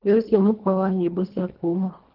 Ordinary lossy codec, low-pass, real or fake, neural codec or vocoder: Opus, 16 kbps; 5.4 kHz; fake; codec, 24 kHz, 1.5 kbps, HILCodec